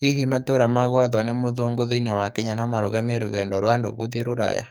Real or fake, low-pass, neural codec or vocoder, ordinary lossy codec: fake; none; codec, 44.1 kHz, 2.6 kbps, SNAC; none